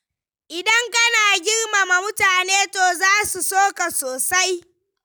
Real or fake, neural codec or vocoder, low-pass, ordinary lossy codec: real; none; none; none